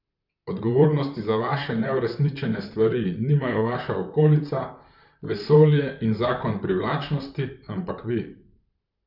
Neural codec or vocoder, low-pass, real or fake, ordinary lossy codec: vocoder, 44.1 kHz, 128 mel bands, Pupu-Vocoder; 5.4 kHz; fake; none